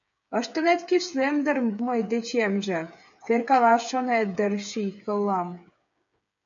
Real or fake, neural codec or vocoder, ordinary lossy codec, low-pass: fake; codec, 16 kHz, 8 kbps, FreqCodec, smaller model; MP3, 96 kbps; 7.2 kHz